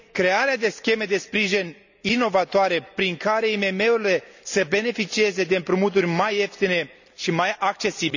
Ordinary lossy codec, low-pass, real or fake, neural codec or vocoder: none; 7.2 kHz; real; none